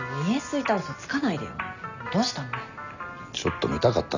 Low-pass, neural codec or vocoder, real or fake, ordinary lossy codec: 7.2 kHz; none; real; none